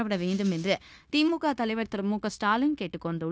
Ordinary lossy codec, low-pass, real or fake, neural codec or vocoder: none; none; fake; codec, 16 kHz, 0.9 kbps, LongCat-Audio-Codec